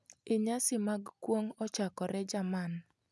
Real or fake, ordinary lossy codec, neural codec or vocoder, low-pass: real; none; none; none